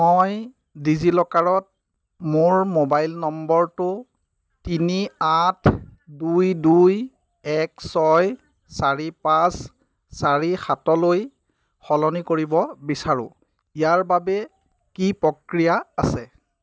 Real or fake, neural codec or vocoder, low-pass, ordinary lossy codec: real; none; none; none